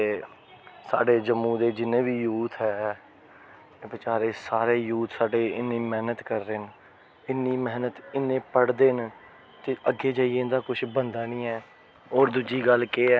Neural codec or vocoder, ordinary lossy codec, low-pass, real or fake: none; none; none; real